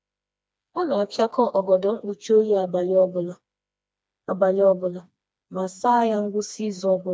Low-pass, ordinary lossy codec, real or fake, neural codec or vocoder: none; none; fake; codec, 16 kHz, 2 kbps, FreqCodec, smaller model